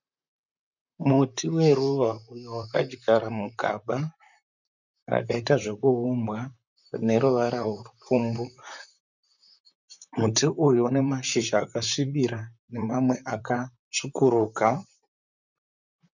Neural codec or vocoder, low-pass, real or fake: vocoder, 44.1 kHz, 128 mel bands, Pupu-Vocoder; 7.2 kHz; fake